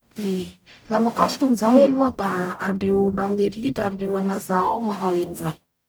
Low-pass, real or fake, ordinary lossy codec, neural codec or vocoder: none; fake; none; codec, 44.1 kHz, 0.9 kbps, DAC